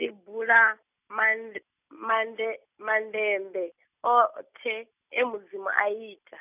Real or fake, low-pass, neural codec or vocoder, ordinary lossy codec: real; 3.6 kHz; none; none